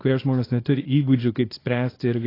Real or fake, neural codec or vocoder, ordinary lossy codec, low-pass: fake; codec, 24 kHz, 0.9 kbps, WavTokenizer, small release; AAC, 24 kbps; 5.4 kHz